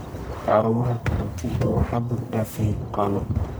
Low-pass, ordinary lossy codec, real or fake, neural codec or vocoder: none; none; fake; codec, 44.1 kHz, 1.7 kbps, Pupu-Codec